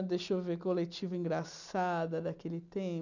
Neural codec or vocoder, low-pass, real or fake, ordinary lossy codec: none; 7.2 kHz; real; none